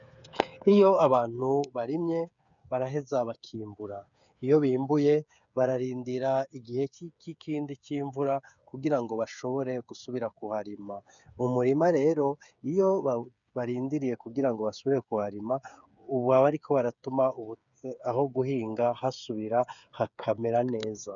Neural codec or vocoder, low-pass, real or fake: codec, 16 kHz, 8 kbps, FreqCodec, smaller model; 7.2 kHz; fake